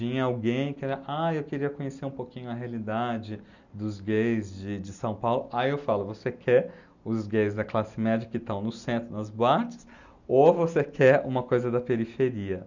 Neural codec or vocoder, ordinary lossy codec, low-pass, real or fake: none; none; 7.2 kHz; real